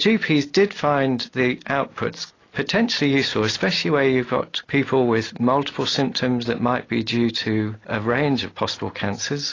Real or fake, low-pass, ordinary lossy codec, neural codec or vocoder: real; 7.2 kHz; AAC, 32 kbps; none